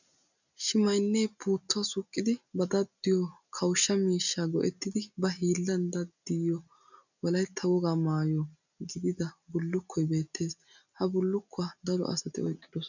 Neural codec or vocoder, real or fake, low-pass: none; real; 7.2 kHz